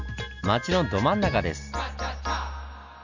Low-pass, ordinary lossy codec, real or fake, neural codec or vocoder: 7.2 kHz; none; real; none